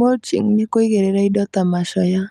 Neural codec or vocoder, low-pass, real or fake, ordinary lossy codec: none; 14.4 kHz; real; Opus, 32 kbps